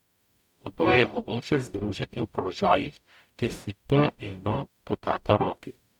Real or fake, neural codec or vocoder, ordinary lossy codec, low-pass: fake; codec, 44.1 kHz, 0.9 kbps, DAC; none; 19.8 kHz